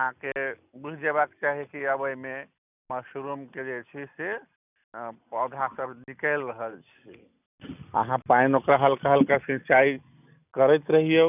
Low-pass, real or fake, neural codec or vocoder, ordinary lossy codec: 3.6 kHz; real; none; none